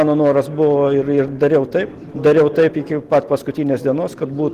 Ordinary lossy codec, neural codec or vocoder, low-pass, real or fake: Opus, 16 kbps; none; 14.4 kHz; real